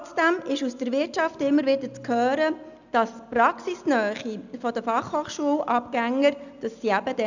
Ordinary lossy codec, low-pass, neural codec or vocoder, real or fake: none; 7.2 kHz; none; real